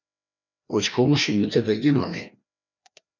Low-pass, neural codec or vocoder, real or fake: 7.2 kHz; codec, 16 kHz, 1 kbps, FreqCodec, larger model; fake